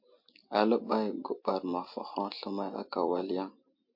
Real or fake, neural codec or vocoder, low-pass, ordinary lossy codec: real; none; 5.4 kHz; MP3, 32 kbps